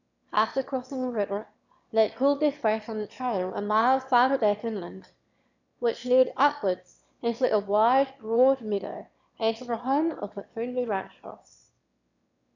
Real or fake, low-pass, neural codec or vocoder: fake; 7.2 kHz; autoencoder, 22.05 kHz, a latent of 192 numbers a frame, VITS, trained on one speaker